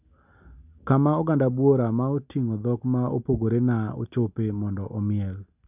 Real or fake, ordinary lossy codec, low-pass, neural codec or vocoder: real; none; 3.6 kHz; none